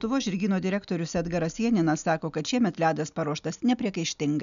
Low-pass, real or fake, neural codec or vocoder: 7.2 kHz; real; none